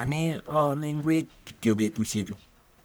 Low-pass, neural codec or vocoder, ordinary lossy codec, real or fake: none; codec, 44.1 kHz, 1.7 kbps, Pupu-Codec; none; fake